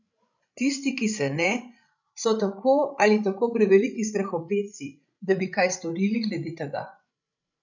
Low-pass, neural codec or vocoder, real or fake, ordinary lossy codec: 7.2 kHz; codec, 16 kHz, 16 kbps, FreqCodec, larger model; fake; none